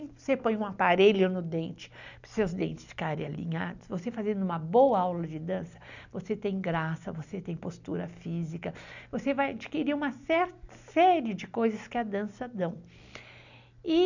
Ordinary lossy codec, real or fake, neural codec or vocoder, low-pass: none; real; none; 7.2 kHz